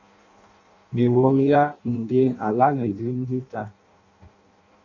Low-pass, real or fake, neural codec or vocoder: 7.2 kHz; fake; codec, 16 kHz in and 24 kHz out, 0.6 kbps, FireRedTTS-2 codec